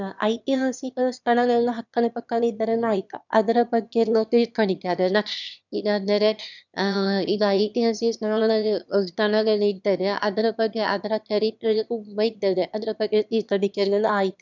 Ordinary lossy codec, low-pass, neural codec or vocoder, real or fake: none; 7.2 kHz; autoencoder, 22.05 kHz, a latent of 192 numbers a frame, VITS, trained on one speaker; fake